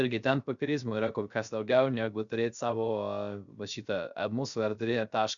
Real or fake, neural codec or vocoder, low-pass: fake; codec, 16 kHz, 0.3 kbps, FocalCodec; 7.2 kHz